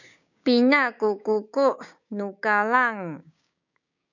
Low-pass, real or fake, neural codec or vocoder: 7.2 kHz; fake; codec, 16 kHz, 6 kbps, DAC